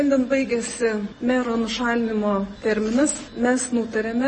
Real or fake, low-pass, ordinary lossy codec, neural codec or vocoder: real; 10.8 kHz; MP3, 32 kbps; none